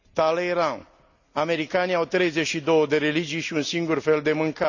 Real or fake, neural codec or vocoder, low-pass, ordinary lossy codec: real; none; 7.2 kHz; none